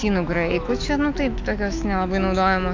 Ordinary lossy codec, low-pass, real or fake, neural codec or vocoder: AAC, 48 kbps; 7.2 kHz; fake; autoencoder, 48 kHz, 128 numbers a frame, DAC-VAE, trained on Japanese speech